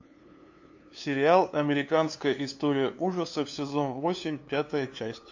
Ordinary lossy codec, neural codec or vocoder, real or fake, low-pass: AAC, 48 kbps; codec, 16 kHz, 2 kbps, FunCodec, trained on LibriTTS, 25 frames a second; fake; 7.2 kHz